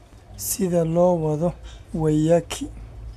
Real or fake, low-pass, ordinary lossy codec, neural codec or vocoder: real; 14.4 kHz; MP3, 96 kbps; none